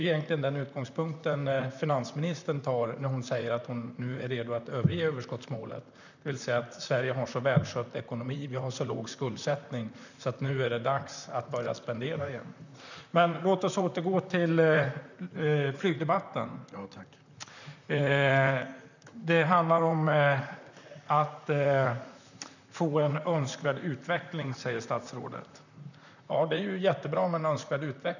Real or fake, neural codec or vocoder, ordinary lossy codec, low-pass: fake; vocoder, 44.1 kHz, 128 mel bands, Pupu-Vocoder; none; 7.2 kHz